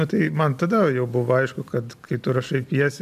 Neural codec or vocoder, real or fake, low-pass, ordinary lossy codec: none; real; 14.4 kHz; MP3, 96 kbps